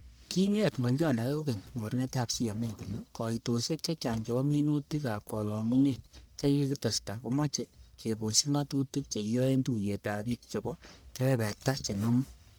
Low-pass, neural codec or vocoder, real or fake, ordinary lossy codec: none; codec, 44.1 kHz, 1.7 kbps, Pupu-Codec; fake; none